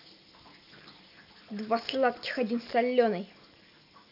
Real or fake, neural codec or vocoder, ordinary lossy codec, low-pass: real; none; none; 5.4 kHz